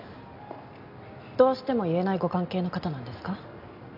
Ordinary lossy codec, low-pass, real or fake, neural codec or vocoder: MP3, 48 kbps; 5.4 kHz; real; none